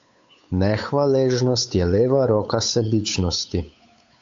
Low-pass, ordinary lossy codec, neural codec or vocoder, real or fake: 7.2 kHz; AAC, 64 kbps; codec, 16 kHz, 8 kbps, FunCodec, trained on Chinese and English, 25 frames a second; fake